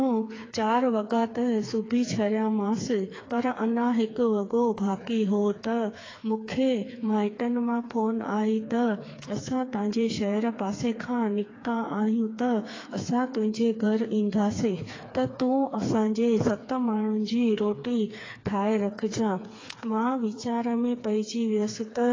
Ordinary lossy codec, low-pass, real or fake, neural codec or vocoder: AAC, 32 kbps; 7.2 kHz; fake; codec, 16 kHz, 4 kbps, FreqCodec, smaller model